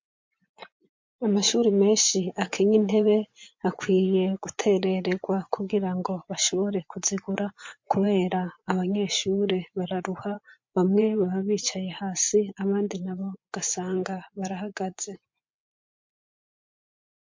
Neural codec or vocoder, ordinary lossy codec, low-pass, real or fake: vocoder, 24 kHz, 100 mel bands, Vocos; MP3, 48 kbps; 7.2 kHz; fake